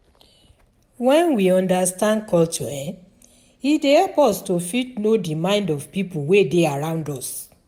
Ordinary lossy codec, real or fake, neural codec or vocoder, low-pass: none; real; none; 19.8 kHz